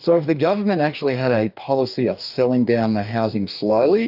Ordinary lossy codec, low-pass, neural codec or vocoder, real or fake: AAC, 48 kbps; 5.4 kHz; codec, 44.1 kHz, 2.6 kbps, DAC; fake